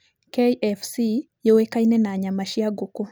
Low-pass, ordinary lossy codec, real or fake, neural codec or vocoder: none; none; real; none